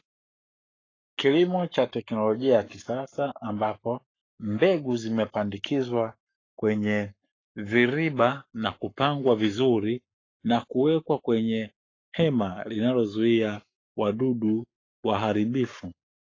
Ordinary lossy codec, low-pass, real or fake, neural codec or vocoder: AAC, 32 kbps; 7.2 kHz; fake; codec, 44.1 kHz, 7.8 kbps, Pupu-Codec